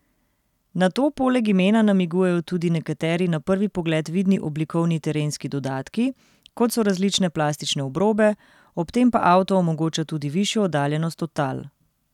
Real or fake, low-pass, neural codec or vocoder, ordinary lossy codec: real; 19.8 kHz; none; none